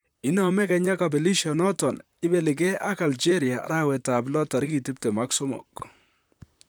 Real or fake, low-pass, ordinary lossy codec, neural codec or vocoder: fake; none; none; vocoder, 44.1 kHz, 128 mel bands, Pupu-Vocoder